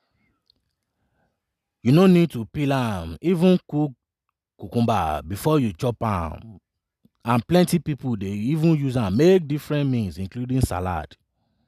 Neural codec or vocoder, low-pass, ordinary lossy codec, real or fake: none; 14.4 kHz; none; real